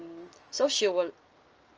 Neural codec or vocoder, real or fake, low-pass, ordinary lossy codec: none; real; 7.2 kHz; Opus, 24 kbps